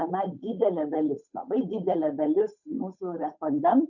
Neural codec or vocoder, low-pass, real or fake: codec, 16 kHz, 8 kbps, FunCodec, trained on Chinese and English, 25 frames a second; 7.2 kHz; fake